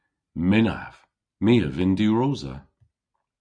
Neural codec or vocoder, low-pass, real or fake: none; 9.9 kHz; real